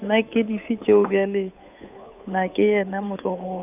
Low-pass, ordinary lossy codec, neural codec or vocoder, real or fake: 3.6 kHz; none; codec, 16 kHz, 8 kbps, FunCodec, trained on Chinese and English, 25 frames a second; fake